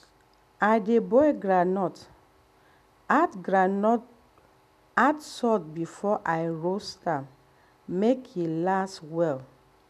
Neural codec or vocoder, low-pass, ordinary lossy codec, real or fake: none; 14.4 kHz; none; real